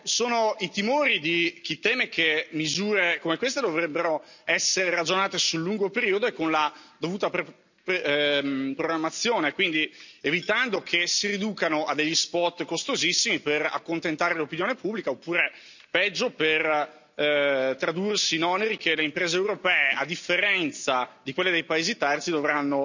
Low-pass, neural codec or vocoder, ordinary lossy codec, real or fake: 7.2 kHz; none; none; real